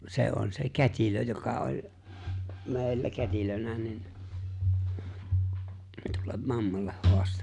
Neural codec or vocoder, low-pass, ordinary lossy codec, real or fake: none; 10.8 kHz; none; real